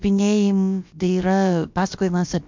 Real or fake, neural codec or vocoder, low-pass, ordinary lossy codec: fake; codec, 16 kHz, about 1 kbps, DyCAST, with the encoder's durations; 7.2 kHz; MP3, 64 kbps